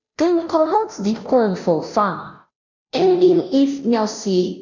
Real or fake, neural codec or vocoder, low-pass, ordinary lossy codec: fake; codec, 16 kHz, 0.5 kbps, FunCodec, trained on Chinese and English, 25 frames a second; 7.2 kHz; none